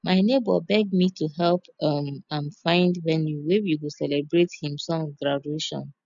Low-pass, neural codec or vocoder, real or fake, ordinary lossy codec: 7.2 kHz; none; real; none